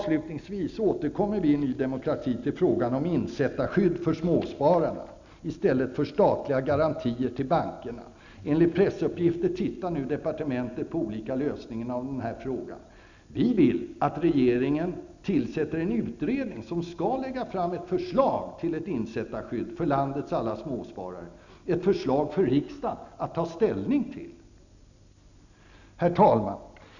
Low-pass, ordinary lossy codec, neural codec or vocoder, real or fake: 7.2 kHz; none; none; real